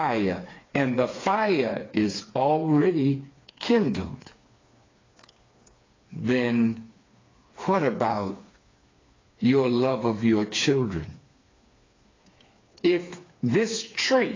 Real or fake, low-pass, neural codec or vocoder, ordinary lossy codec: fake; 7.2 kHz; codec, 16 kHz, 4 kbps, FreqCodec, smaller model; AAC, 32 kbps